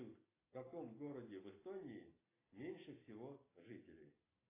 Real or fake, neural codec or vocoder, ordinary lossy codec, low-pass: real; none; AAC, 16 kbps; 3.6 kHz